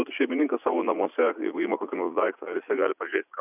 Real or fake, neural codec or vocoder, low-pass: fake; vocoder, 44.1 kHz, 80 mel bands, Vocos; 3.6 kHz